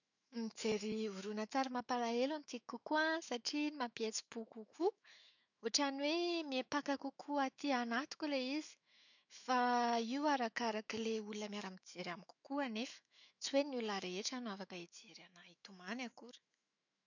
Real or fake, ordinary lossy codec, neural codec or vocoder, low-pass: fake; none; autoencoder, 48 kHz, 128 numbers a frame, DAC-VAE, trained on Japanese speech; 7.2 kHz